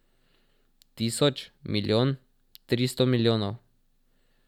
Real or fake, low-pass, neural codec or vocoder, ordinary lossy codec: real; 19.8 kHz; none; none